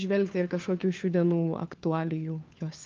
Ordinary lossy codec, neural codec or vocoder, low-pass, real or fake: Opus, 24 kbps; codec, 16 kHz, 4 kbps, FunCodec, trained on LibriTTS, 50 frames a second; 7.2 kHz; fake